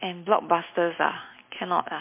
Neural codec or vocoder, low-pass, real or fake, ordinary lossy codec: none; 3.6 kHz; real; MP3, 24 kbps